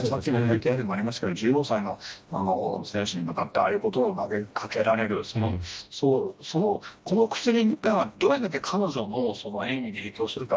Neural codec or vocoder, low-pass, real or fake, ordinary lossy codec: codec, 16 kHz, 1 kbps, FreqCodec, smaller model; none; fake; none